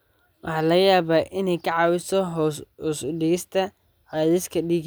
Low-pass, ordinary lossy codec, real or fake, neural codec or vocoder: none; none; real; none